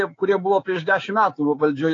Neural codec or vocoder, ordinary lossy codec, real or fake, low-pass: codec, 16 kHz, 6 kbps, DAC; AAC, 32 kbps; fake; 7.2 kHz